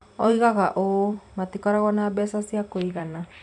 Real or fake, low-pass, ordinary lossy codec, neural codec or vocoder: fake; 10.8 kHz; none; vocoder, 44.1 kHz, 128 mel bands every 256 samples, BigVGAN v2